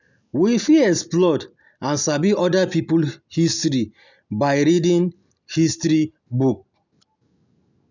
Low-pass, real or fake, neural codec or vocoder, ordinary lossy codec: 7.2 kHz; real; none; none